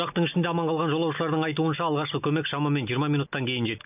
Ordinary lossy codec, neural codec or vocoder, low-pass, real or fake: none; none; 3.6 kHz; real